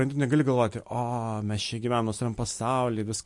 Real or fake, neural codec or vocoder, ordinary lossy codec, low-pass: real; none; MP3, 48 kbps; 10.8 kHz